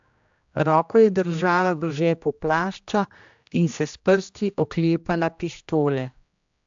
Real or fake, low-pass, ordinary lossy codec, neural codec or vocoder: fake; 7.2 kHz; AAC, 64 kbps; codec, 16 kHz, 1 kbps, X-Codec, HuBERT features, trained on general audio